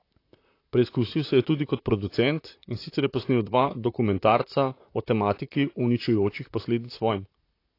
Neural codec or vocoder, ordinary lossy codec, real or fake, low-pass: vocoder, 44.1 kHz, 128 mel bands, Pupu-Vocoder; AAC, 32 kbps; fake; 5.4 kHz